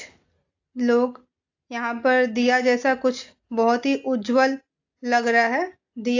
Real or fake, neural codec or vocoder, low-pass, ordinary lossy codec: real; none; 7.2 kHz; AAC, 48 kbps